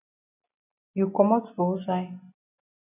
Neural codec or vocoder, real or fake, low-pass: none; real; 3.6 kHz